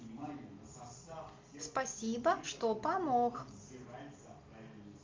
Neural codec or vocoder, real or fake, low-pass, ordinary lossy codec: none; real; 7.2 kHz; Opus, 24 kbps